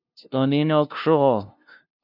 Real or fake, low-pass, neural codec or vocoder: fake; 5.4 kHz; codec, 16 kHz, 0.5 kbps, FunCodec, trained on LibriTTS, 25 frames a second